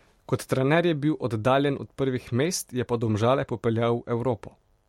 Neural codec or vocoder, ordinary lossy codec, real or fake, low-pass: vocoder, 44.1 kHz, 128 mel bands every 512 samples, BigVGAN v2; MP3, 64 kbps; fake; 19.8 kHz